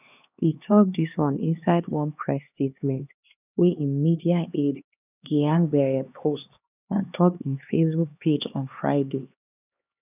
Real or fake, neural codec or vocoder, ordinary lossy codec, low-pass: fake; codec, 16 kHz, 2 kbps, X-Codec, HuBERT features, trained on LibriSpeech; none; 3.6 kHz